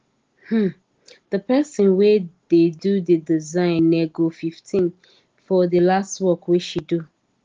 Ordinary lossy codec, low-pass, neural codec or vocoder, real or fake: Opus, 16 kbps; 7.2 kHz; none; real